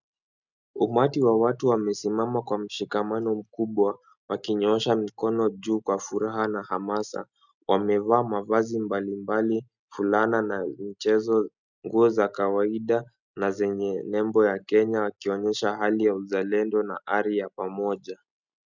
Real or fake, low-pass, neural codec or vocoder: real; 7.2 kHz; none